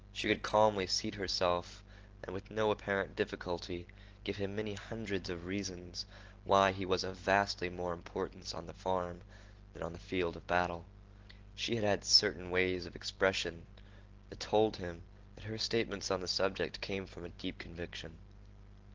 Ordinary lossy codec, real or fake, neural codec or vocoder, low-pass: Opus, 16 kbps; real; none; 7.2 kHz